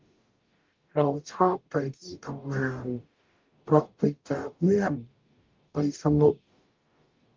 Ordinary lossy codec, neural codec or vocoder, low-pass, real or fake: Opus, 24 kbps; codec, 44.1 kHz, 0.9 kbps, DAC; 7.2 kHz; fake